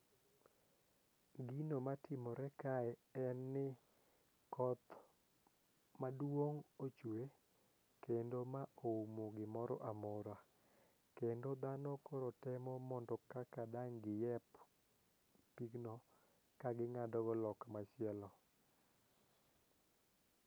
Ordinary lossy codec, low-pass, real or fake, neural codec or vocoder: none; none; real; none